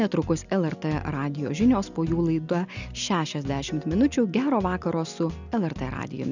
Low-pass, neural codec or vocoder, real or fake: 7.2 kHz; none; real